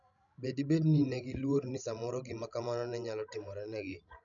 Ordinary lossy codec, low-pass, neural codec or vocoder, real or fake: none; 7.2 kHz; codec, 16 kHz, 16 kbps, FreqCodec, larger model; fake